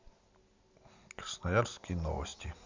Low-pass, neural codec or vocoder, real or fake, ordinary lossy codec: 7.2 kHz; none; real; MP3, 64 kbps